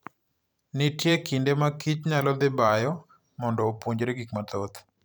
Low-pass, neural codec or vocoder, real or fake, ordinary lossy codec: none; vocoder, 44.1 kHz, 128 mel bands every 512 samples, BigVGAN v2; fake; none